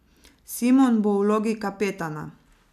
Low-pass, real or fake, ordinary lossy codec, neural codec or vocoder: 14.4 kHz; real; none; none